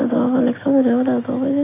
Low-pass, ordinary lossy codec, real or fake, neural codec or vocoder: 3.6 kHz; MP3, 24 kbps; real; none